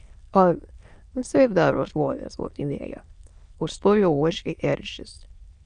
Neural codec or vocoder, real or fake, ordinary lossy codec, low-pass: autoencoder, 22.05 kHz, a latent of 192 numbers a frame, VITS, trained on many speakers; fake; Opus, 64 kbps; 9.9 kHz